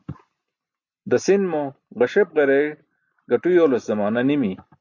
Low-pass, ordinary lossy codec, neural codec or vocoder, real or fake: 7.2 kHz; AAC, 48 kbps; none; real